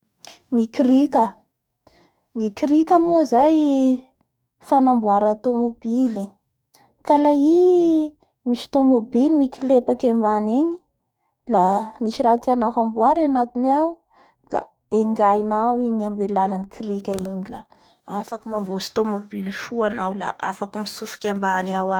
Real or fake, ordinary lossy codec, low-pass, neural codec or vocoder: fake; none; 19.8 kHz; codec, 44.1 kHz, 2.6 kbps, DAC